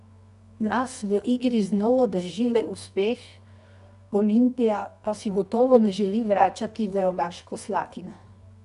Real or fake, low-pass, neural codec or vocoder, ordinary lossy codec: fake; 10.8 kHz; codec, 24 kHz, 0.9 kbps, WavTokenizer, medium music audio release; none